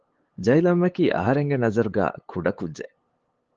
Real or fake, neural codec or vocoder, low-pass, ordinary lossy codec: fake; codec, 16 kHz, 8 kbps, FunCodec, trained on LibriTTS, 25 frames a second; 7.2 kHz; Opus, 16 kbps